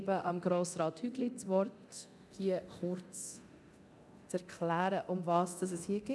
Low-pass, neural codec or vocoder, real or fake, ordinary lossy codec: none; codec, 24 kHz, 0.9 kbps, DualCodec; fake; none